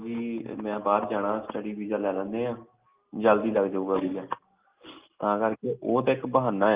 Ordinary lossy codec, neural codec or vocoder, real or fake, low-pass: Opus, 24 kbps; none; real; 3.6 kHz